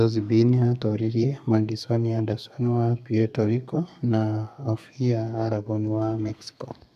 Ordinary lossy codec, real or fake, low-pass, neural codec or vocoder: none; fake; 14.4 kHz; codec, 32 kHz, 1.9 kbps, SNAC